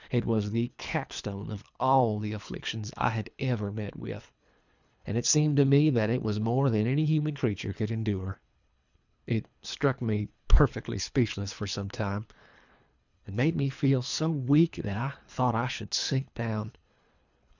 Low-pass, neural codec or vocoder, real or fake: 7.2 kHz; codec, 24 kHz, 3 kbps, HILCodec; fake